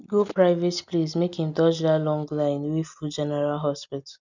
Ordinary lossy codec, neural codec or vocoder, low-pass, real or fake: none; none; 7.2 kHz; real